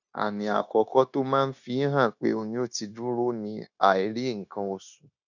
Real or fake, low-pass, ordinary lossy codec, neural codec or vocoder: fake; 7.2 kHz; none; codec, 16 kHz, 0.9 kbps, LongCat-Audio-Codec